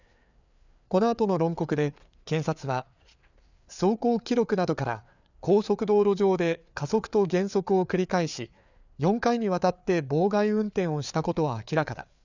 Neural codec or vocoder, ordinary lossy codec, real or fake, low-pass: codec, 16 kHz, 4 kbps, FreqCodec, larger model; none; fake; 7.2 kHz